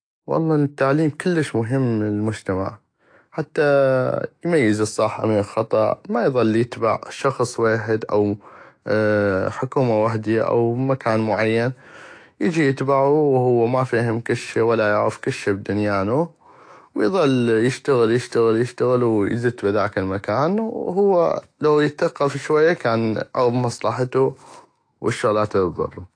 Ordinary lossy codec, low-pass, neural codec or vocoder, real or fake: AAC, 48 kbps; 9.9 kHz; autoencoder, 48 kHz, 128 numbers a frame, DAC-VAE, trained on Japanese speech; fake